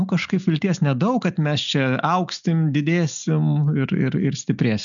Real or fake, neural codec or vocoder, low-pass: real; none; 7.2 kHz